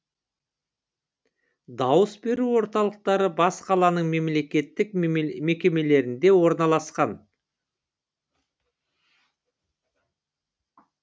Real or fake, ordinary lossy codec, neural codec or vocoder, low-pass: real; none; none; none